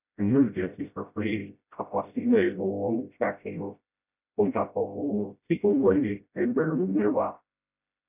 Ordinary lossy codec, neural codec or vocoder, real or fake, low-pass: none; codec, 16 kHz, 0.5 kbps, FreqCodec, smaller model; fake; 3.6 kHz